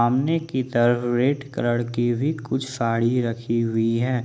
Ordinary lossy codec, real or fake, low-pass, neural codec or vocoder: none; real; none; none